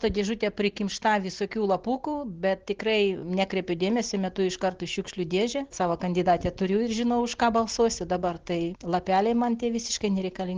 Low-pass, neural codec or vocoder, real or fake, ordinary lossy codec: 7.2 kHz; none; real; Opus, 16 kbps